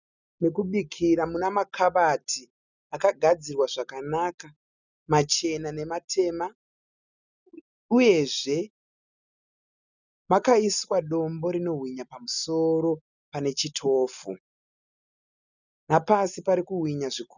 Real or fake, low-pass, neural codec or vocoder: real; 7.2 kHz; none